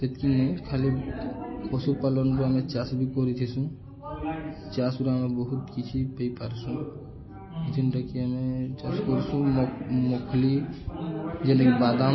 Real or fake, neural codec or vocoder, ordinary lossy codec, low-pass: real; none; MP3, 24 kbps; 7.2 kHz